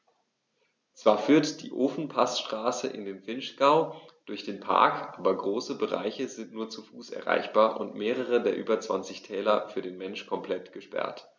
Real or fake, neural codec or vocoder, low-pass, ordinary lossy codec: real; none; none; none